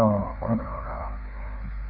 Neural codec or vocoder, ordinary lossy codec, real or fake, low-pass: codec, 16 kHz, 4 kbps, FreqCodec, larger model; none; fake; 5.4 kHz